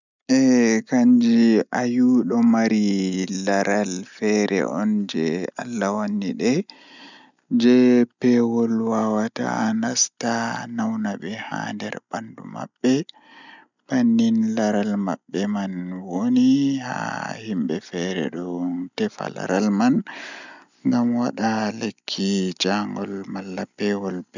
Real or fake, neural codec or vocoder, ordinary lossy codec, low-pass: real; none; none; 7.2 kHz